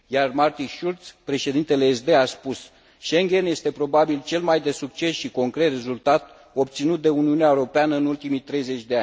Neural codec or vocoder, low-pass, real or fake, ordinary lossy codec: none; none; real; none